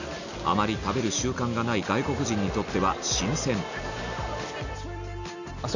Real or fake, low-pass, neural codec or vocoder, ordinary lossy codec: real; 7.2 kHz; none; none